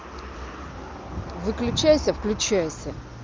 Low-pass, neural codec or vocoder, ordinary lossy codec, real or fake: 7.2 kHz; none; Opus, 24 kbps; real